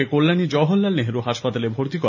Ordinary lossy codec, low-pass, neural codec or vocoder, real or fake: none; 7.2 kHz; none; real